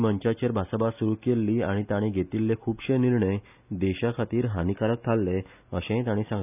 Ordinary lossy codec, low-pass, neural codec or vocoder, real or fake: none; 3.6 kHz; none; real